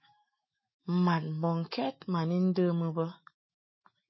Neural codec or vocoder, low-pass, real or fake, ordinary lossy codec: autoencoder, 48 kHz, 128 numbers a frame, DAC-VAE, trained on Japanese speech; 7.2 kHz; fake; MP3, 24 kbps